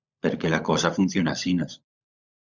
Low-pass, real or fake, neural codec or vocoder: 7.2 kHz; fake; codec, 16 kHz, 16 kbps, FunCodec, trained on LibriTTS, 50 frames a second